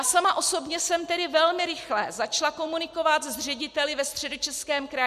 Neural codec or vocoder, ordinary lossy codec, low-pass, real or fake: none; MP3, 96 kbps; 14.4 kHz; real